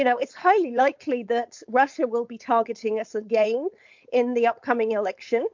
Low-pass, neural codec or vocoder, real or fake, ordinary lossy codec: 7.2 kHz; codec, 16 kHz, 4.8 kbps, FACodec; fake; MP3, 64 kbps